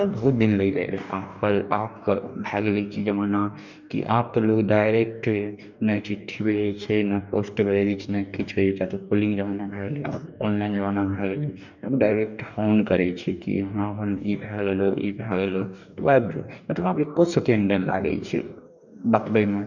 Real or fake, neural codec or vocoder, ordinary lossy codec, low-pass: fake; codec, 44.1 kHz, 2.6 kbps, DAC; none; 7.2 kHz